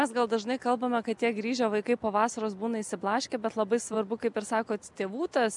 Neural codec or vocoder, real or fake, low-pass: none; real; 10.8 kHz